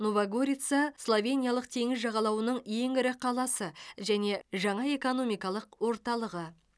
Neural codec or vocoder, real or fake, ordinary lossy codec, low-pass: none; real; none; none